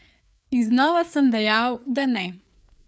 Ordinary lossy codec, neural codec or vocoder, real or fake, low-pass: none; codec, 16 kHz, 4 kbps, FreqCodec, larger model; fake; none